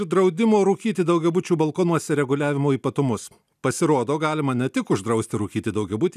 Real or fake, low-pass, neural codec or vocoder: real; 14.4 kHz; none